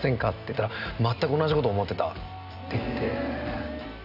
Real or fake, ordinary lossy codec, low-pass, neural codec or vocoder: real; Opus, 64 kbps; 5.4 kHz; none